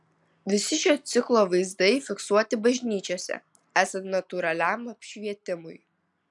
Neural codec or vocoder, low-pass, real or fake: none; 10.8 kHz; real